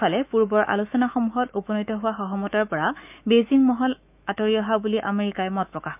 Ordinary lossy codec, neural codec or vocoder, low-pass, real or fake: none; autoencoder, 48 kHz, 128 numbers a frame, DAC-VAE, trained on Japanese speech; 3.6 kHz; fake